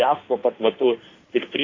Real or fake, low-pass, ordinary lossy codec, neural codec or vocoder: fake; 7.2 kHz; AAC, 32 kbps; codec, 16 kHz in and 24 kHz out, 1.1 kbps, FireRedTTS-2 codec